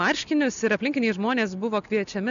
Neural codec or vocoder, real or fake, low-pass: none; real; 7.2 kHz